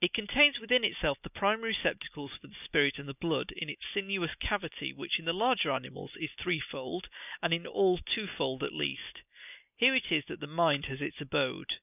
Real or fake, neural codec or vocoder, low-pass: real; none; 3.6 kHz